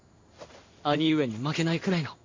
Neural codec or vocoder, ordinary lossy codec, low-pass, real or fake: codec, 16 kHz in and 24 kHz out, 1 kbps, XY-Tokenizer; MP3, 48 kbps; 7.2 kHz; fake